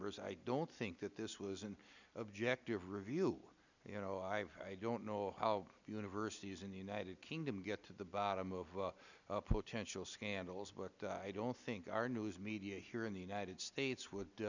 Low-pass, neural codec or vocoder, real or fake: 7.2 kHz; none; real